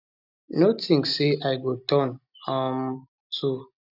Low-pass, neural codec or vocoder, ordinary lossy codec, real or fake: 5.4 kHz; none; none; real